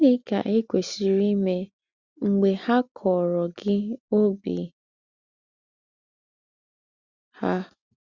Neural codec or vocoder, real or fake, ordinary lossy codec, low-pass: none; real; Opus, 64 kbps; 7.2 kHz